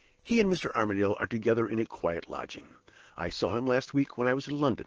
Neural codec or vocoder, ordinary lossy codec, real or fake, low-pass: codec, 16 kHz, 6 kbps, DAC; Opus, 16 kbps; fake; 7.2 kHz